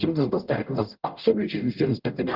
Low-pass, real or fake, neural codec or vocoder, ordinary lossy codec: 5.4 kHz; fake; codec, 44.1 kHz, 0.9 kbps, DAC; Opus, 32 kbps